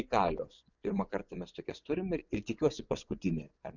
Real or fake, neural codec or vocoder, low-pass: fake; vocoder, 24 kHz, 100 mel bands, Vocos; 7.2 kHz